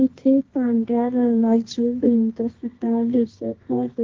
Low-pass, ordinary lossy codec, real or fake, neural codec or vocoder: 7.2 kHz; Opus, 16 kbps; fake; codec, 24 kHz, 0.9 kbps, WavTokenizer, medium music audio release